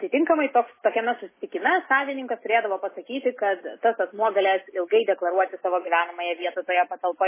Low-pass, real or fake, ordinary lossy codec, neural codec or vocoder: 3.6 kHz; real; MP3, 16 kbps; none